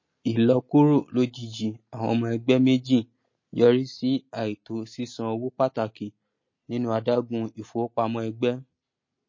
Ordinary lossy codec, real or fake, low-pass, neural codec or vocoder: MP3, 32 kbps; real; 7.2 kHz; none